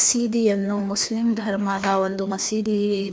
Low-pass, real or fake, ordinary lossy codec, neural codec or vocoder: none; fake; none; codec, 16 kHz, 2 kbps, FreqCodec, larger model